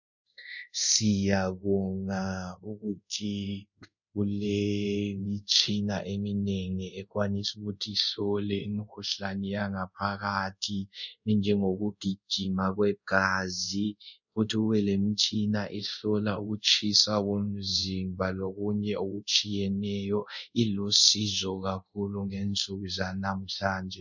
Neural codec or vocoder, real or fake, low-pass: codec, 24 kHz, 0.5 kbps, DualCodec; fake; 7.2 kHz